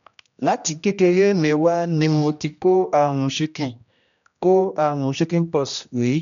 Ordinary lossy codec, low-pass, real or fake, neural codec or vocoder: none; 7.2 kHz; fake; codec, 16 kHz, 1 kbps, X-Codec, HuBERT features, trained on general audio